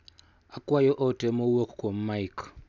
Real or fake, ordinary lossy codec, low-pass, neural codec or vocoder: real; none; 7.2 kHz; none